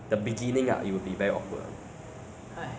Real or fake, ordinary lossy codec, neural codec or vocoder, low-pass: real; none; none; none